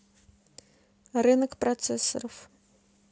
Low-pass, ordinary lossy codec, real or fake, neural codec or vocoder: none; none; real; none